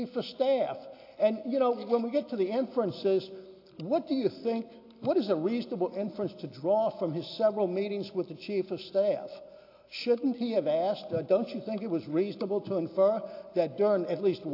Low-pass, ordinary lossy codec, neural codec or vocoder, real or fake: 5.4 kHz; AAC, 32 kbps; none; real